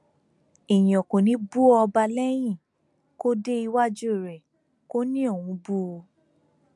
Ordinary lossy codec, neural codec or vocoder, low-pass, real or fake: MP3, 96 kbps; none; 10.8 kHz; real